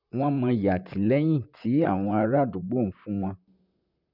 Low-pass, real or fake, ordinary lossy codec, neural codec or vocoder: 5.4 kHz; fake; none; vocoder, 44.1 kHz, 128 mel bands, Pupu-Vocoder